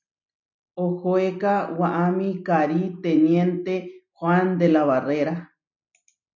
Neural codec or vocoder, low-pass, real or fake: none; 7.2 kHz; real